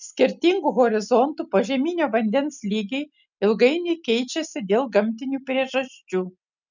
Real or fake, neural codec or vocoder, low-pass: real; none; 7.2 kHz